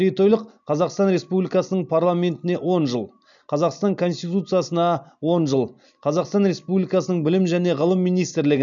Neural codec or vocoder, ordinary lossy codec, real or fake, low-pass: none; none; real; 7.2 kHz